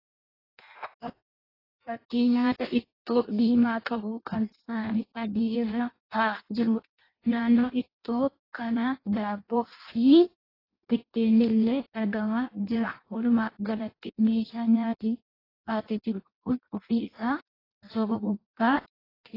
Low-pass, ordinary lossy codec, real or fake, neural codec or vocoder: 5.4 kHz; AAC, 24 kbps; fake; codec, 16 kHz in and 24 kHz out, 0.6 kbps, FireRedTTS-2 codec